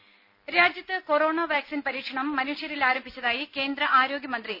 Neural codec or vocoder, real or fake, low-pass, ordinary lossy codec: none; real; 5.4 kHz; none